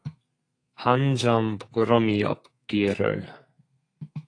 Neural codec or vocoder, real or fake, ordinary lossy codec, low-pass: codec, 32 kHz, 1.9 kbps, SNAC; fake; AAC, 48 kbps; 9.9 kHz